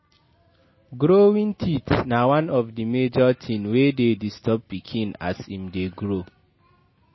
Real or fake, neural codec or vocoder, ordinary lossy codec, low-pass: real; none; MP3, 24 kbps; 7.2 kHz